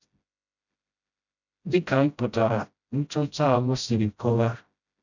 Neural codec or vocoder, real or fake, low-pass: codec, 16 kHz, 0.5 kbps, FreqCodec, smaller model; fake; 7.2 kHz